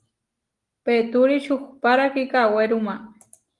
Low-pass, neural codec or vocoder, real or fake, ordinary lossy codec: 10.8 kHz; none; real; Opus, 24 kbps